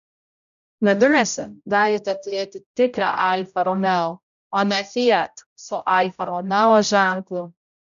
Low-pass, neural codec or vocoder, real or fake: 7.2 kHz; codec, 16 kHz, 0.5 kbps, X-Codec, HuBERT features, trained on general audio; fake